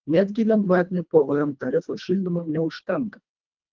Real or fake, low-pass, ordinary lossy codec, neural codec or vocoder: fake; 7.2 kHz; Opus, 24 kbps; codec, 24 kHz, 1.5 kbps, HILCodec